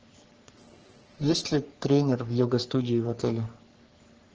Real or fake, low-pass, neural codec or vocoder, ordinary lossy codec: fake; 7.2 kHz; codec, 44.1 kHz, 3.4 kbps, Pupu-Codec; Opus, 16 kbps